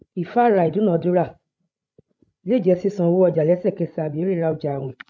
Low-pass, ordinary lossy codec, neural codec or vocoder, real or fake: none; none; codec, 16 kHz, 16 kbps, FreqCodec, larger model; fake